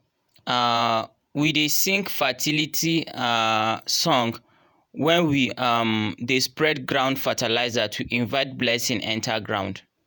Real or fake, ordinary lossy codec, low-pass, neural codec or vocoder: fake; none; none; vocoder, 48 kHz, 128 mel bands, Vocos